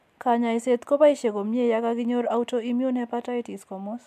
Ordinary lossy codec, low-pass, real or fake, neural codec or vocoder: MP3, 96 kbps; 14.4 kHz; real; none